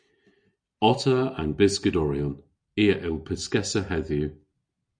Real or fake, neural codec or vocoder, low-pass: real; none; 9.9 kHz